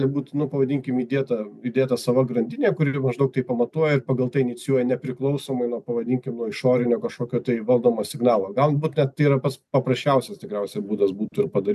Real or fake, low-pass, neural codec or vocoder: real; 14.4 kHz; none